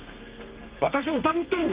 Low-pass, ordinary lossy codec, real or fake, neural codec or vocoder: 3.6 kHz; Opus, 24 kbps; fake; codec, 32 kHz, 1.9 kbps, SNAC